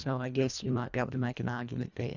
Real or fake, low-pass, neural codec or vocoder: fake; 7.2 kHz; codec, 24 kHz, 1.5 kbps, HILCodec